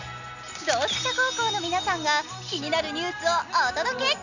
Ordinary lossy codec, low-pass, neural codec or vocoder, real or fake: none; 7.2 kHz; none; real